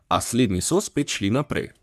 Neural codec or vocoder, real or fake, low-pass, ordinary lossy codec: codec, 44.1 kHz, 3.4 kbps, Pupu-Codec; fake; 14.4 kHz; none